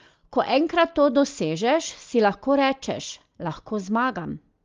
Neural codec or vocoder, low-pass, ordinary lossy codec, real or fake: none; 7.2 kHz; Opus, 32 kbps; real